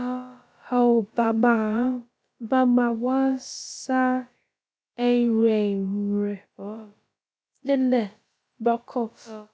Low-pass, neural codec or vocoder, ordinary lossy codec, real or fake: none; codec, 16 kHz, about 1 kbps, DyCAST, with the encoder's durations; none; fake